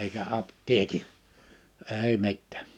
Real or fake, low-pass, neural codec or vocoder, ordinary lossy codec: fake; 19.8 kHz; codec, 44.1 kHz, 7.8 kbps, DAC; none